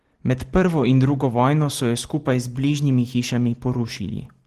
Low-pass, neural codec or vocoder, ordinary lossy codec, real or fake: 14.4 kHz; none; Opus, 16 kbps; real